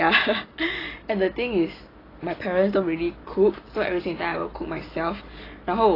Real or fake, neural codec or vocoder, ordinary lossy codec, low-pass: real; none; AAC, 24 kbps; 5.4 kHz